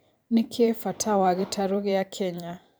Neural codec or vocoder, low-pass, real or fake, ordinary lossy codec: vocoder, 44.1 kHz, 128 mel bands every 512 samples, BigVGAN v2; none; fake; none